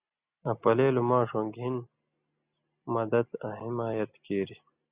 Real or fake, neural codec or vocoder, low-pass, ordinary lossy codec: real; none; 3.6 kHz; Opus, 64 kbps